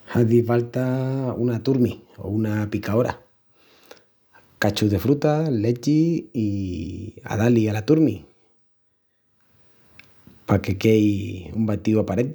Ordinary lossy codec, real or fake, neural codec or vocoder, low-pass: none; real; none; none